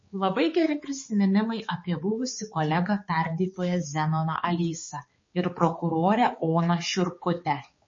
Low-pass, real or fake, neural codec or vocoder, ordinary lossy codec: 7.2 kHz; fake; codec, 16 kHz, 4 kbps, X-Codec, HuBERT features, trained on balanced general audio; MP3, 32 kbps